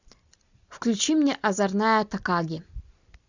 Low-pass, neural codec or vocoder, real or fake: 7.2 kHz; none; real